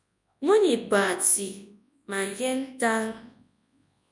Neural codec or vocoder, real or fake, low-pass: codec, 24 kHz, 0.9 kbps, WavTokenizer, large speech release; fake; 10.8 kHz